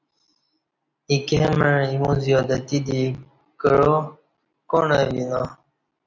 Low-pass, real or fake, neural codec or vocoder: 7.2 kHz; real; none